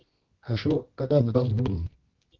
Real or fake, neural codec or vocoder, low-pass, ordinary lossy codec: fake; codec, 24 kHz, 0.9 kbps, WavTokenizer, medium music audio release; 7.2 kHz; Opus, 16 kbps